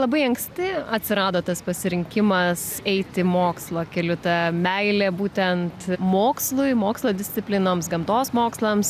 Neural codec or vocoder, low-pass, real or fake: none; 14.4 kHz; real